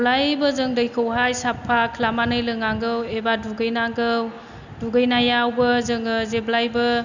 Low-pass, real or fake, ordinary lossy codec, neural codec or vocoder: 7.2 kHz; real; none; none